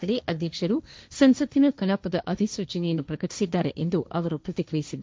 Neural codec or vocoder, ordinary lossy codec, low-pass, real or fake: codec, 16 kHz, 1.1 kbps, Voila-Tokenizer; none; none; fake